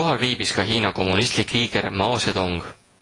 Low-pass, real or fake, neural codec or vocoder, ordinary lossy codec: 10.8 kHz; fake; vocoder, 48 kHz, 128 mel bands, Vocos; AAC, 32 kbps